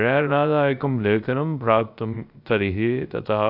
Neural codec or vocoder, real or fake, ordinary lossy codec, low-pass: codec, 16 kHz, 0.3 kbps, FocalCodec; fake; AAC, 48 kbps; 5.4 kHz